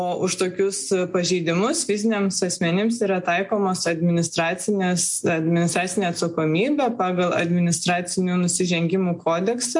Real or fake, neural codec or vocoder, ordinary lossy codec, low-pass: real; none; MP3, 64 kbps; 10.8 kHz